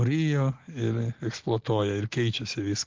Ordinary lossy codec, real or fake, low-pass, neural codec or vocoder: Opus, 16 kbps; real; 7.2 kHz; none